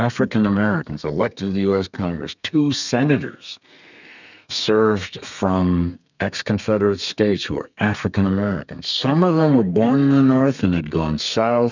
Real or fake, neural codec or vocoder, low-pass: fake; codec, 32 kHz, 1.9 kbps, SNAC; 7.2 kHz